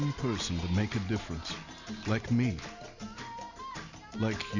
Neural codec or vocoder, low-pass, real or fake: none; 7.2 kHz; real